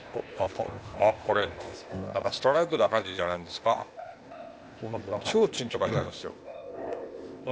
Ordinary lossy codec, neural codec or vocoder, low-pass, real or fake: none; codec, 16 kHz, 0.8 kbps, ZipCodec; none; fake